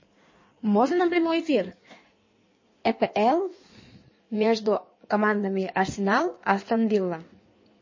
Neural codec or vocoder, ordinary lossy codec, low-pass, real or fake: codec, 16 kHz in and 24 kHz out, 1.1 kbps, FireRedTTS-2 codec; MP3, 32 kbps; 7.2 kHz; fake